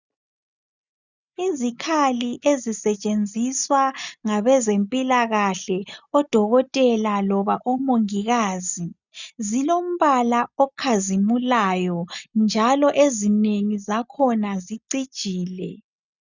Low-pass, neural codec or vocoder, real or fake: 7.2 kHz; none; real